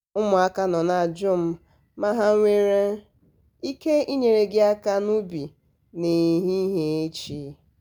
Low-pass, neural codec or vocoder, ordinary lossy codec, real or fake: none; none; none; real